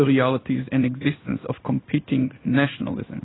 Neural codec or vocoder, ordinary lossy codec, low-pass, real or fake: codec, 16 kHz in and 24 kHz out, 1 kbps, XY-Tokenizer; AAC, 16 kbps; 7.2 kHz; fake